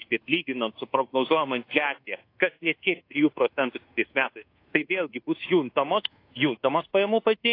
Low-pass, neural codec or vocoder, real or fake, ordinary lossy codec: 5.4 kHz; codec, 24 kHz, 1.2 kbps, DualCodec; fake; AAC, 32 kbps